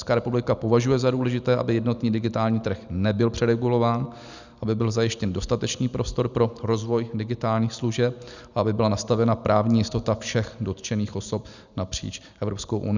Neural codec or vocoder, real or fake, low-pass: none; real; 7.2 kHz